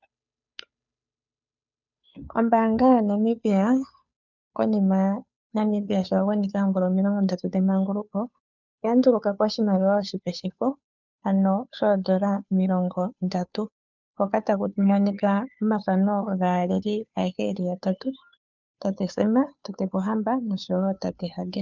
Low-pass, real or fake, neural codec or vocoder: 7.2 kHz; fake; codec, 16 kHz, 2 kbps, FunCodec, trained on Chinese and English, 25 frames a second